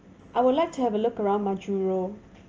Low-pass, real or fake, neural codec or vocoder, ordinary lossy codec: 7.2 kHz; real; none; Opus, 24 kbps